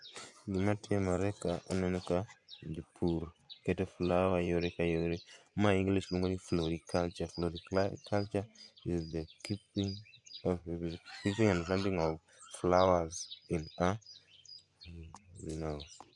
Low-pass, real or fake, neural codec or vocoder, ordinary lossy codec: 10.8 kHz; fake; vocoder, 24 kHz, 100 mel bands, Vocos; none